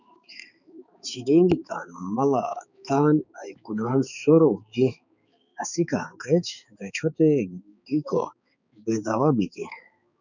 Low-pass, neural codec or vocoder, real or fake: 7.2 kHz; codec, 16 kHz, 4 kbps, X-Codec, HuBERT features, trained on balanced general audio; fake